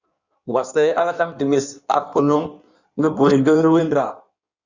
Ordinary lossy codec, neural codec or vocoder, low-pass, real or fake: Opus, 64 kbps; codec, 16 kHz in and 24 kHz out, 1.1 kbps, FireRedTTS-2 codec; 7.2 kHz; fake